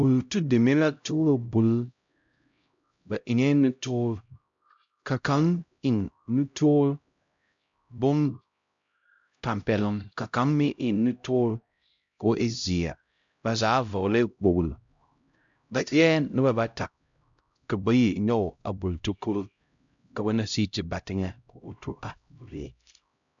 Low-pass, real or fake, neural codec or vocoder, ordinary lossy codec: 7.2 kHz; fake; codec, 16 kHz, 0.5 kbps, X-Codec, HuBERT features, trained on LibriSpeech; MP3, 64 kbps